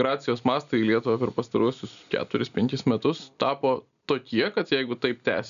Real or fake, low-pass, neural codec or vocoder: real; 7.2 kHz; none